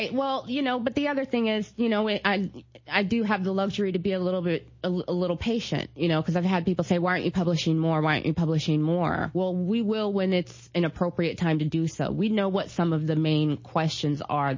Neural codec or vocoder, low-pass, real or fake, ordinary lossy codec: none; 7.2 kHz; real; MP3, 32 kbps